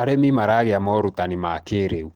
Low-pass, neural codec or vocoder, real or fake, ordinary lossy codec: 19.8 kHz; none; real; Opus, 16 kbps